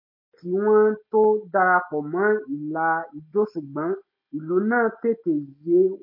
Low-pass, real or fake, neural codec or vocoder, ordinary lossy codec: 5.4 kHz; real; none; MP3, 32 kbps